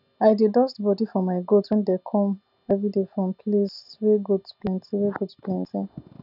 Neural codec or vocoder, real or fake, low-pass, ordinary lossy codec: none; real; 5.4 kHz; none